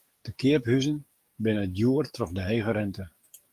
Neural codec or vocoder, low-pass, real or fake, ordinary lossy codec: codec, 44.1 kHz, 7.8 kbps, DAC; 14.4 kHz; fake; Opus, 32 kbps